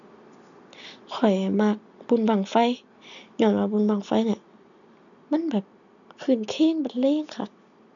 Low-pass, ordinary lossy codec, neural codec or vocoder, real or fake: 7.2 kHz; none; none; real